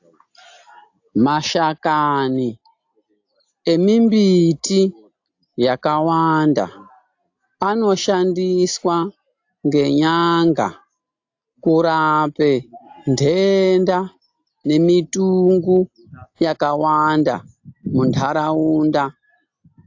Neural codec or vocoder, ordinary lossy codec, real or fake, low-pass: none; AAC, 48 kbps; real; 7.2 kHz